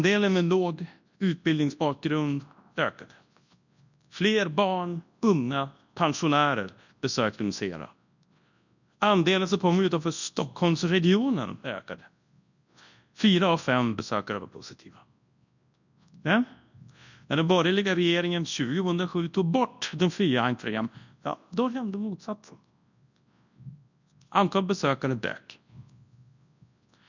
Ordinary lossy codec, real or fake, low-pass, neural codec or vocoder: none; fake; 7.2 kHz; codec, 24 kHz, 0.9 kbps, WavTokenizer, large speech release